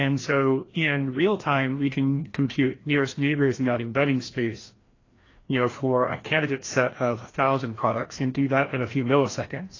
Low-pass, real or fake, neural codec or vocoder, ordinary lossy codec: 7.2 kHz; fake; codec, 16 kHz, 1 kbps, FreqCodec, larger model; AAC, 32 kbps